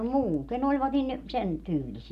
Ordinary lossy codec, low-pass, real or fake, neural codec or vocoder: none; 14.4 kHz; real; none